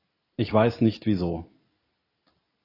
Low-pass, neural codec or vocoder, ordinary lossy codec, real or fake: 5.4 kHz; none; AAC, 24 kbps; real